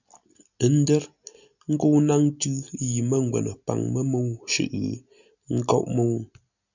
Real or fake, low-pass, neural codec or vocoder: real; 7.2 kHz; none